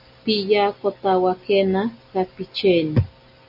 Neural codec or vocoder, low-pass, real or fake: none; 5.4 kHz; real